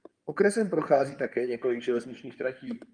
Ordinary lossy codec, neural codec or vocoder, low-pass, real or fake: Opus, 32 kbps; codec, 16 kHz in and 24 kHz out, 2.2 kbps, FireRedTTS-2 codec; 9.9 kHz; fake